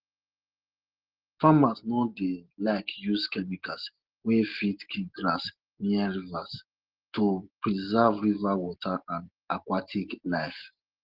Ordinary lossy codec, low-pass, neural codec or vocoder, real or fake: Opus, 16 kbps; 5.4 kHz; none; real